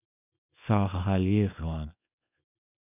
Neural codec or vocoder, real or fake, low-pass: codec, 24 kHz, 0.9 kbps, WavTokenizer, small release; fake; 3.6 kHz